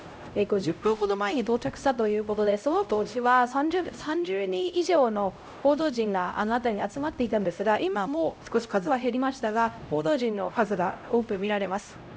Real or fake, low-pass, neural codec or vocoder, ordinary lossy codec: fake; none; codec, 16 kHz, 0.5 kbps, X-Codec, HuBERT features, trained on LibriSpeech; none